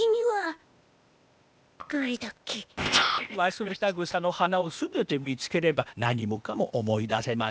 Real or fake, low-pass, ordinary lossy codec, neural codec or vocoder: fake; none; none; codec, 16 kHz, 0.8 kbps, ZipCodec